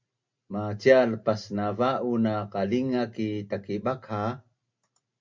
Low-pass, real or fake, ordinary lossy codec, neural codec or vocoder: 7.2 kHz; real; MP3, 64 kbps; none